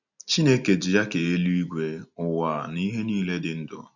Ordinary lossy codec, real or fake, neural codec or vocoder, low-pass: none; real; none; 7.2 kHz